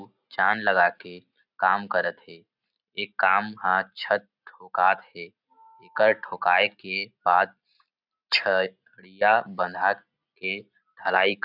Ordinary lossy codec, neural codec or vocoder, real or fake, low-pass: none; none; real; 5.4 kHz